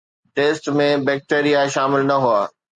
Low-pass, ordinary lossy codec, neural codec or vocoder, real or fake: 9.9 kHz; Opus, 64 kbps; none; real